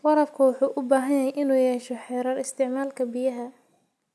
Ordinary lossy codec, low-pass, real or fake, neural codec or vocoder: none; none; real; none